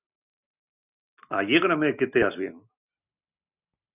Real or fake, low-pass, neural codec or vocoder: real; 3.6 kHz; none